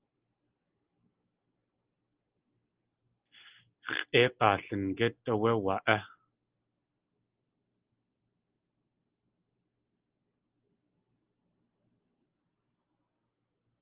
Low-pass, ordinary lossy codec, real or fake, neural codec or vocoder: 3.6 kHz; Opus, 24 kbps; real; none